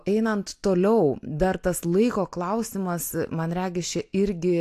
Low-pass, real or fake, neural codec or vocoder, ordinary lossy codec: 14.4 kHz; real; none; AAC, 64 kbps